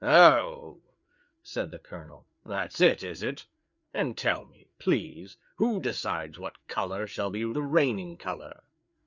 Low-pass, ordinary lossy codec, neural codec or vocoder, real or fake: 7.2 kHz; Opus, 64 kbps; codec, 16 kHz, 4 kbps, FreqCodec, larger model; fake